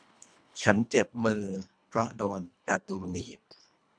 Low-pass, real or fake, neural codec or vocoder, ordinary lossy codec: 9.9 kHz; fake; codec, 24 kHz, 1.5 kbps, HILCodec; none